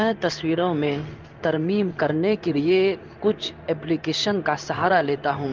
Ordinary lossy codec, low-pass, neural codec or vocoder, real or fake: Opus, 24 kbps; 7.2 kHz; codec, 16 kHz in and 24 kHz out, 1 kbps, XY-Tokenizer; fake